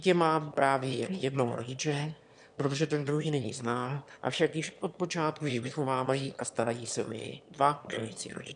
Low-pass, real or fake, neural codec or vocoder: 9.9 kHz; fake; autoencoder, 22.05 kHz, a latent of 192 numbers a frame, VITS, trained on one speaker